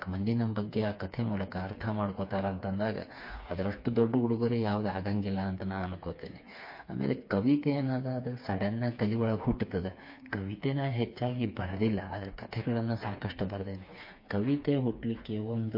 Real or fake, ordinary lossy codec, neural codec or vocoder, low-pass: fake; MP3, 32 kbps; codec, 16 kHz, 4 kbps, FreqCodec, smaller model; 5.4 kHz